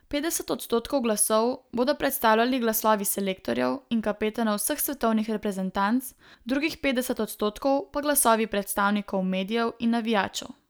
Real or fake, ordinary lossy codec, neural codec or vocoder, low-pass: real; none; none; none